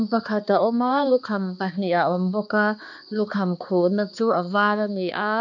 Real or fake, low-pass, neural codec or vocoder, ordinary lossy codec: fake; 7.2 kHz; codec, 16 kHz, 2 kbps, X-Codec, HuBERT features, trained on balanced general audio; none